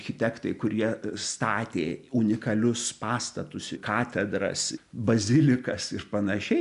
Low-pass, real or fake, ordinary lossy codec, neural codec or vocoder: 10.8 kHz; real; MP3, 96 kbps; none